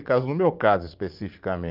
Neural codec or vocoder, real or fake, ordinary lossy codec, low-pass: vocoder, 44.1 kHz, 80 mel bands, Vocos; fake; Opus, 24 kbps; 5.4 kHz